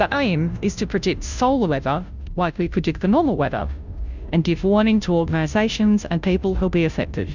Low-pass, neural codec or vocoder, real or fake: 7.2 kHz; codec, 16 kHz, 0.5 kbps, FunCodec, trained on Chinese and English, 25 frames a second; fake